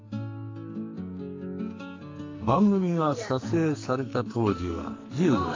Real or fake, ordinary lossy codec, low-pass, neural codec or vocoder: fake; AAC, 32 kbps; 7.2 kHz; codec, 44.1 kHz, 2.6 kbps, SNAC